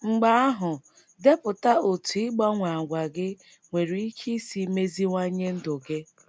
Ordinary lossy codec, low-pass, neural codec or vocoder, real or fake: none; none; none; real